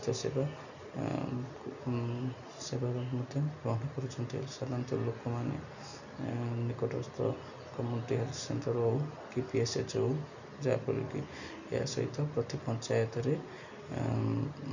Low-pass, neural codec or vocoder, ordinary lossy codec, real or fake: 7.2 kHz; none; none; real